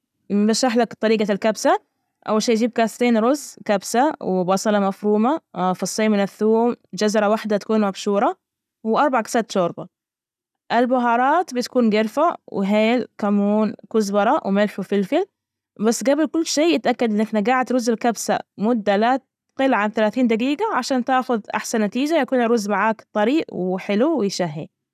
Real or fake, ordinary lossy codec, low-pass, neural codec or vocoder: real; none; 14.4 kHz; none